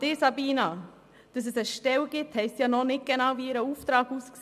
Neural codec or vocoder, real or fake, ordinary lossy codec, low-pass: none; real; none; 14.4 kHz